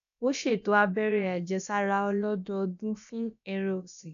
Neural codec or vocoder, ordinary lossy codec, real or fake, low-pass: codec, 16 kHz, about 1 kbps, DyCAST, with the encoder's durations; none; fake; 7.2 kHz